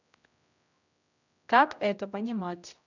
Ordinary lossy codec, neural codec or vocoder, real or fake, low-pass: none; codec, 16 kHz, 0.5 kbps, X-Codec, HuBERT features, trained on general audio; fake; 7.2 kHz